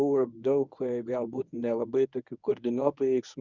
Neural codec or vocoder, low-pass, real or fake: codec, 24 kHz, 0.9 kbps, WavTokenizer, medium speech release version 1; 7.2 kHz; fake